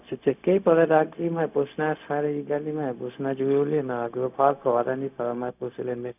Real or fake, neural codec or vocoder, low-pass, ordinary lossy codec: fake; codec, 16 kHz, 0.4 kbps, LongCat-Audio-Codec; 3.6 kHz; none